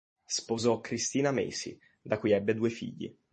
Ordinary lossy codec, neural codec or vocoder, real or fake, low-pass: MP3, 32 kbps; none; real; 10.8 kHz